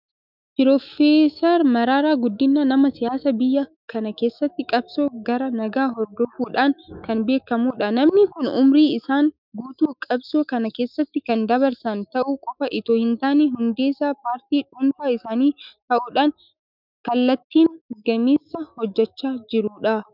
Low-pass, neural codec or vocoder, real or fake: 5.4 kHz; autoencoder, 48 kHz, 128 numbers a frame, DAC-VAE, trained on Japanese speech; fake